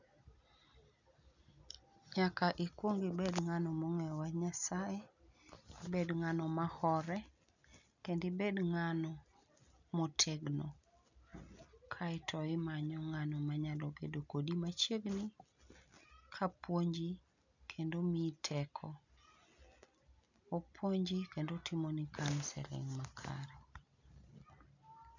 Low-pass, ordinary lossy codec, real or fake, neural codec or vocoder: 7.2 kHz; none; real; none